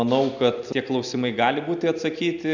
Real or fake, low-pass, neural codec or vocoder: real; 7.2 kHz; none